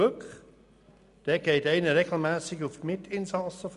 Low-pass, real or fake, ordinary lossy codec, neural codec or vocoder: 10.8 kHz; real; none; none